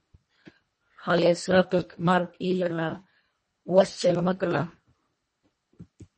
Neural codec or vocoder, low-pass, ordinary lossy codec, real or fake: codec, 24 kHz, 1.5 kbps, HILCodec; 10.8 kHz; MP3, 32 kbps; fake